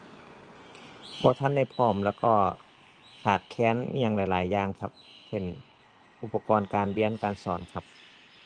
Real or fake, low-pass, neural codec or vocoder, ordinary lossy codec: fake; 9.9 kHz; vocoder, 24 kHz, 100 mel bands, Vocos; Opus, 24 kbps